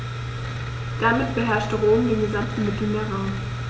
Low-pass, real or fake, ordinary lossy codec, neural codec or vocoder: none; real; none; none